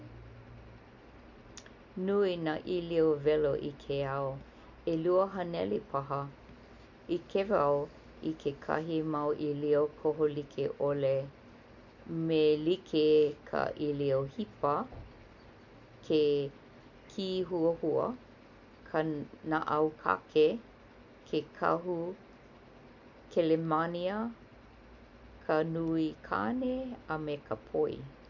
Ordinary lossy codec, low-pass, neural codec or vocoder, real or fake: none; 7.2 kHz; none; real